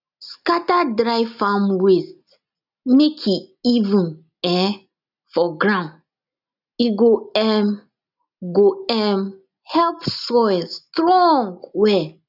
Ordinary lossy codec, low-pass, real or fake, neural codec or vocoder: none; 5.4 kHz; real; none